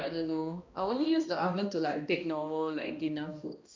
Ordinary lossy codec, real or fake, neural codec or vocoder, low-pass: MP3, 64 kbps; fake; codec, 16 kHz, 1 kbps, X-Codec, HuBERT features, trained on balanced general audio; 7.2 kHz